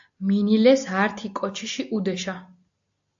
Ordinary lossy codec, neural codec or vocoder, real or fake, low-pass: AAC, 64 kbps; none; real; 7.2 kHz